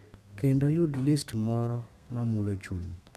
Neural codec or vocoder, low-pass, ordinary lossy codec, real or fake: codec, 32 kHz, 1.9 kbps, SNAC; 14.4 kHz; none; fake